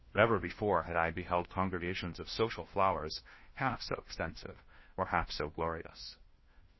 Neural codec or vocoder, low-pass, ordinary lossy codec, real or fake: codec, 16 kHz, 1 kbps, FunCodec, trained on LibriTTS, 50 frames a second; 7.2 kHz; MP3, 24 kbps; fake